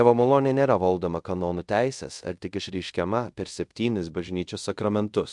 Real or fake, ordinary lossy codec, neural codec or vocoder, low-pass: fake; MP3, 64 kbps; codec, 24 kHz, 0.5 kbps, DualCodec; 10.8 kHz